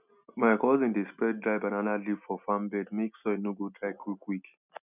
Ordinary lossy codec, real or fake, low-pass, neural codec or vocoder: none; real; 3.6 kHz; none